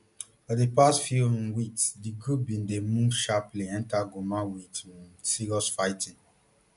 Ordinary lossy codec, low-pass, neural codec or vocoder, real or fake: none; 10.8 kHz; none; real